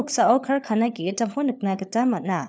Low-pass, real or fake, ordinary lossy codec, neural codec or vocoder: none; fake; none; codec, 16 kHz, 4 kbps, FunCodec, trained on LibriTTS, 50 frames a second